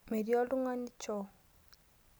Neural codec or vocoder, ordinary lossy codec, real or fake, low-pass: none; none; real; none